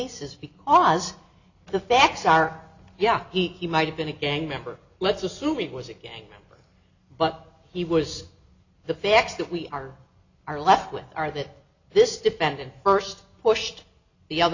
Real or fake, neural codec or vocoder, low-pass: real; none; 7.2 kHz